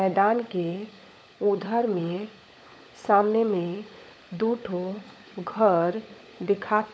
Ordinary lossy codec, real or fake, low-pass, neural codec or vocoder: none; fake; none; codec, 16 kHz, 16 kbps, FunCodec, trained on LibriTTS, 50 frames a second